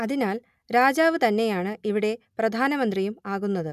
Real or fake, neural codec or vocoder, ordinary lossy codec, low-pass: real; none; none; 14.4 kHz